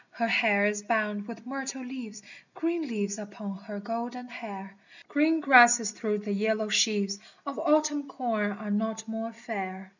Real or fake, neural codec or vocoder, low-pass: real; none; 7.2 kHz